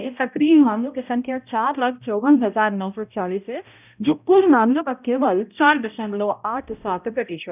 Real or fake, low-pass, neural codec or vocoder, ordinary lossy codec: fake; 3.6 kHz; codec, 16 kHz, 0.5 kbps, X-Codec, HuBERT features, trained on balanced general audio; none